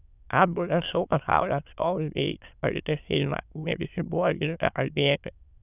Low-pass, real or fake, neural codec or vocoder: 3.6 kHz; fake; autoencoder, 22.05 kHz, a latent of 192 numbers a frame, VITS, trained on many speakers